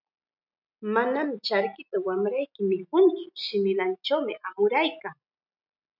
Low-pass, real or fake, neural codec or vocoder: 5.4 kHz; real; none